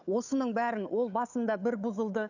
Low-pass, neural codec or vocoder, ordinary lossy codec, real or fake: 7.2 kHz; codec, 16 kHz, 8 kbps, FunCodec, trained on Chinese and English, 25 frames a second; none; fake